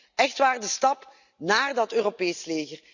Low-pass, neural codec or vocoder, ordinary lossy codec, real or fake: 7.2 kHz; none; none; real